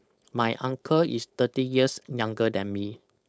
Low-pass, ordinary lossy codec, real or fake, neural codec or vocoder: none; none; fake; codec, 16 kHz, 4.8 kbps, FACodec